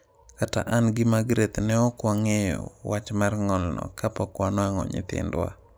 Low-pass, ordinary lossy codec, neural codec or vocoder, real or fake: none; none; none; real